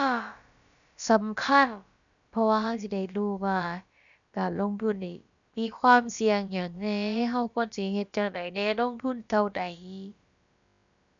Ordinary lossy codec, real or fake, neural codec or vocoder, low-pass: none; fake; codec, 16 kHz, about 1 kbps, DyCAST, with the encoder's durations; 7.2 kHz